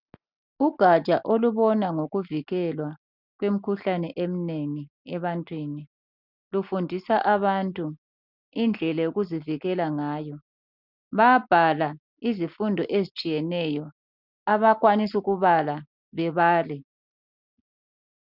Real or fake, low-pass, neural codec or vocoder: real; 5.4 kHz; none